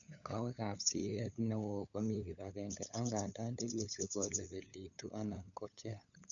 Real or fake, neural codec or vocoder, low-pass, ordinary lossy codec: fake; codec, 16 kHz, 8 kbps, FunCodec, trained on LibriTTS, 25 frames a second; 7.2 kHz; none